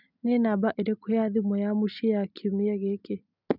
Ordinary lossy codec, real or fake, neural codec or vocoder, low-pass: none; real; none; 5.4 kHz